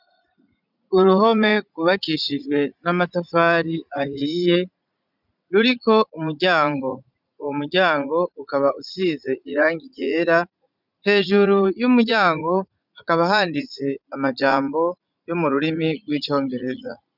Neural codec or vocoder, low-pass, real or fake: vocoder, 44.1 kHz, 80 mel bands, Vocos; 5.4 kHz; fake